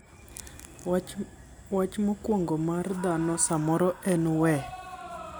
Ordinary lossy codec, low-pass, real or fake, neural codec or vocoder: none; none; real; none